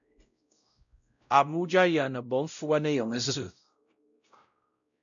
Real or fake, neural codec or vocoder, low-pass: fake; codec, 16 kHz, 0.5 kbps, X-Codec, WavLM features, trained on Multilingual LibriSpeech; 7.2 kHz